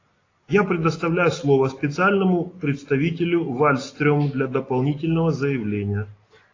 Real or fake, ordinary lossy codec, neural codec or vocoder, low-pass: real; AAC, 32 kbps; none; 7.2 kHz